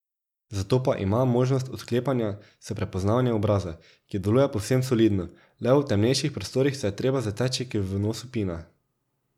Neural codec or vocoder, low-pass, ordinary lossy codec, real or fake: none; 19.8 kHz; none; real